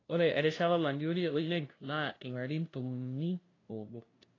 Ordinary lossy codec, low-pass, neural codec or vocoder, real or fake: AAC, 32 kbps; 7.2 kHz; codec, 16 kHz, 0.5 kbps, FunCodec, trained on LibriTTS, 25 frames a second; fake